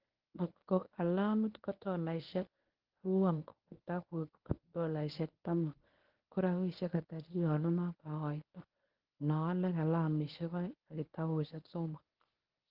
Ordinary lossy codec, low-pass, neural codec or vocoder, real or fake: Opus, 16 kbps; 5.4 kHz; codec, 24 kHz, 0.9 kbps, WavTokenizer, medium speech release version 1; fake